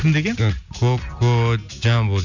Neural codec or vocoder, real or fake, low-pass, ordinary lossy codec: none; real; 7.2 kHz; none